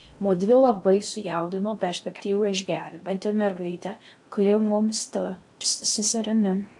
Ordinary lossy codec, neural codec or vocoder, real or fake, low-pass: AAC, 64 kbps; codec, 16 kHz in and 24 kHz out, 0.6 kbps, FocalCodec, streaming, 4096 codes; fake; 10.8 kHz